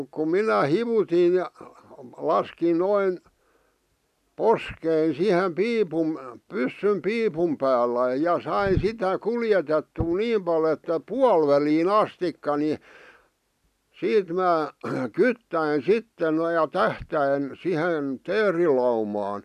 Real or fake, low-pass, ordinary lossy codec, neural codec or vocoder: real; 14.4 kHz; none; none